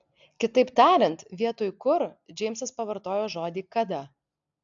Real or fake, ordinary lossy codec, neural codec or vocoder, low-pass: real; AAC, 64 kbps; none; 7.2 kHz